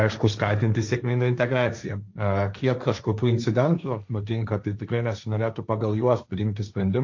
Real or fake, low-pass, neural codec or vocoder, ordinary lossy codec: fake; 7.2 kHz; codec, 16 kHz, 1.1 kbps, Voila-Tokenizer; AAC, 48 kbps